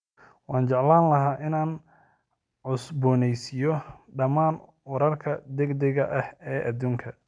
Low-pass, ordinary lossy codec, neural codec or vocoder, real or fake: 9.9 kHz; none; none; real